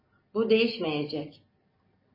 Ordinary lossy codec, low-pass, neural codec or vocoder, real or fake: MP3, 24 kbps; 5.4 kHz; none; real